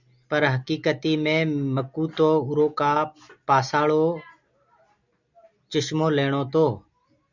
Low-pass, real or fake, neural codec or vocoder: 7.2 kHz; real; none